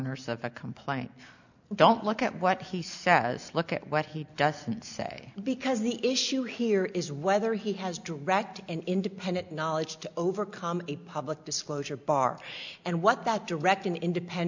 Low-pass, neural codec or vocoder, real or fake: 7.2 kHz; none; real